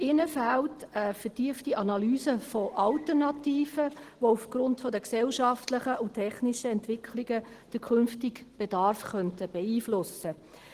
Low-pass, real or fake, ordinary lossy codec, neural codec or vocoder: 14.4 kHz; real; Opus, 16 kbps; none